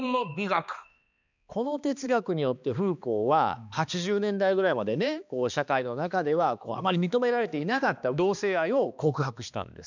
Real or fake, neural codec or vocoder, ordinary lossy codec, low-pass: fake; codec, 16 kHz, 2 kbps, X-Codec, HuBERT features, trained on balanced general audio; none; 7.2 kHz